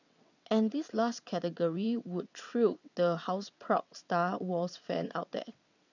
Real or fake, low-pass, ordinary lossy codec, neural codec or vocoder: fake; 7.2 kHz; none; vocoder, 22.05 kHz, 80 mel bands, WaveNeXt